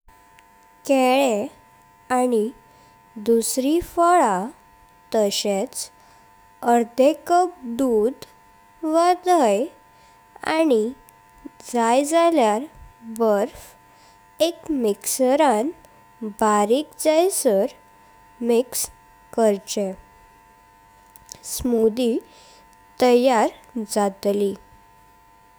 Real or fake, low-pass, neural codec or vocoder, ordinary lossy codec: fake; none; autoencoder, 48 kHz, 128 numbers a frame, DAC-VAE, trained on Japanese speech; none